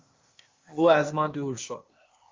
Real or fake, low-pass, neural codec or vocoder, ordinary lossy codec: fake; 7.2 kHz; codec, 16 kHz, 0.8 kbps, ZipCodec; Opus, 32 kbps